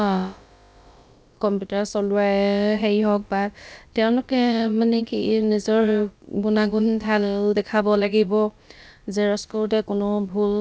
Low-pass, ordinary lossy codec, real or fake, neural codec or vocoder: none; none; fake; codec, 16 kHz, about 1 kbps, DyCAST, with the encoder's durations